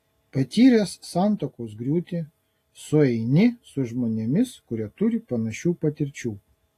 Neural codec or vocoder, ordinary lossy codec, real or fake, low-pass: none; AAC, 48 kbps; real; 14.4 kHz